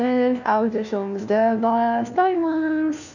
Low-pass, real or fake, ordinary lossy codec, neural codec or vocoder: 7.2 kHz; fake; none; codec, 16 kHz, 1 kbps, FunCodec, trained on LibriTTS, 50 frames a second